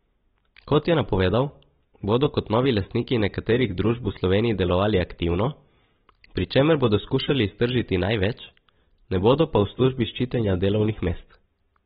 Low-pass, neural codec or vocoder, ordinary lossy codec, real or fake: 19.8 kHz; none; AAC, 16 kbps; real